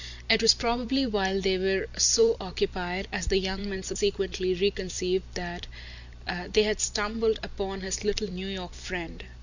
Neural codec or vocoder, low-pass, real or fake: vocoder, 44.1 kHz, 128 mel bands every 256 samples, BigVGAN v2; 7.2 kHz; fake